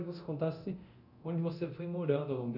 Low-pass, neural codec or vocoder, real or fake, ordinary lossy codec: 5.4 kHz; codec, 24 kHz, 0.9 kbps, DualCodec; fake; none